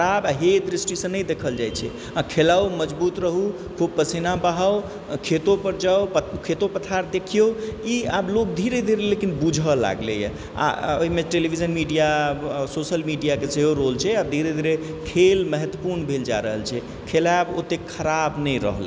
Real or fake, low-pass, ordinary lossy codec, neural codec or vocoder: real; none; none; none